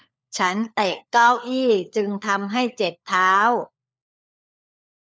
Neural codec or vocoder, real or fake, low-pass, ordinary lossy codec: codec, 16 kHz, 4 kbps, FunCodec, trained on LibriTTS, 50 frames a second; fake; none; none